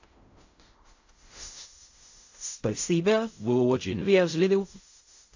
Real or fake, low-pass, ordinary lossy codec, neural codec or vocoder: fake; 7.2 kHz; AAC, 48 kbps; codec, 16 kHz in and 24 kHz out, 0.4 kbps, LongCat-Audio-Codec, fine tuned four codebook decoder